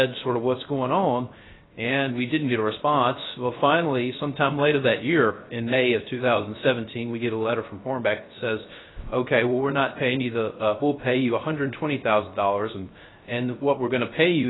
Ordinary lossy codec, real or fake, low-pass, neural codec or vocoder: AAC, 16 kbps; fake; 7.2 kHz; codec, 16 kHz, 0.3 kbps, FocalCodec